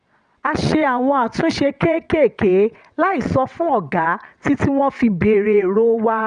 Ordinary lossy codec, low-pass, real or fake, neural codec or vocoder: none; 9.9 kHz; fake; vocoder, 22.05 kHz, 80 mel bands, WaveNeXt